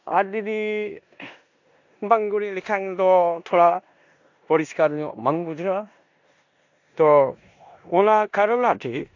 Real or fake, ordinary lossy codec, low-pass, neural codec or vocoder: fake; AAC, 48 kbps; 7.2 kHz; codec, 16 kHz in and 24 kHz out, 0.9 kbps, LongCat-Audio-Codec, four codebook decoder